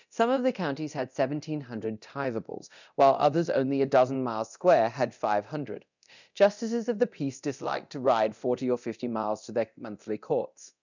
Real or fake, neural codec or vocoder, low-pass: fake; codec, 24 kHz, 0.9 kbps, DualCodec; 7.2 kHz